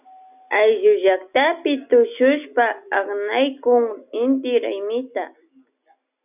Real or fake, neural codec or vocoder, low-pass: real; none; 3.6 kHz